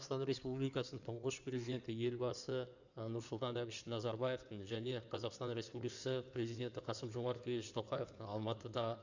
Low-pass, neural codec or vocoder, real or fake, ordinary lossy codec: 7.2 kHz; codec, 16 kHz in and 24 kHz out, 2.2 kbps, FireRedTTS-2 codec; fake; none